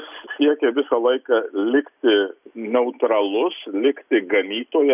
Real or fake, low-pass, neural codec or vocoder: real; 3.6 kHz; none